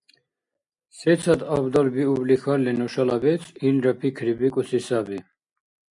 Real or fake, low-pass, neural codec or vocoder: real; 10.8 kHz; none